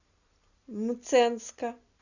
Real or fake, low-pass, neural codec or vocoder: real; 7.2 kHz; none